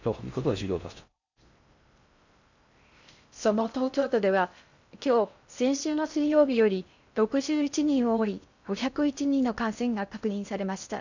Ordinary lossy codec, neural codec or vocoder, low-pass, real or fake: none; codec, 16 kHz in and 24 kHz out, 0.6 kbps, FocalCodec, streaming, 4096 codes; 7.2 kHz; fake